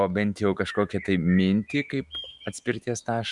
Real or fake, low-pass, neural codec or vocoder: fake; 10.8 kHz; autoencoder, 48 kHz, 128 numbers a frame, DAC-VAE, trained on Japanese speech